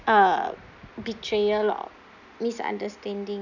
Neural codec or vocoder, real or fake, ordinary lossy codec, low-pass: none; real; none; 7.2 kHz